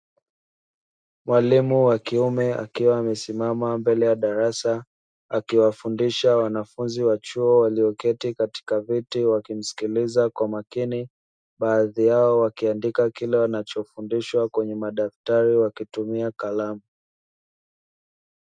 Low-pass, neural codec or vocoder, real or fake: 9.9 kHz; none; real